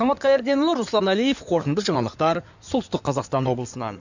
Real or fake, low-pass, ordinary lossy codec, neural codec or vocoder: fake; 7.2 kHz; none; codec, 16 kHz in and 24 kHz out, 2.2 kbps, FireRedTTS-2 codec